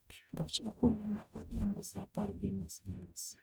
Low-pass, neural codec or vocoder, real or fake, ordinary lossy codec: none; codec, 44.1 kHz, 0.9 kbps, DAC; fake; none